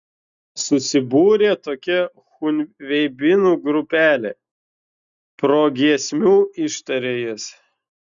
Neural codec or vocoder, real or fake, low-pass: none; real; 7.2 kHz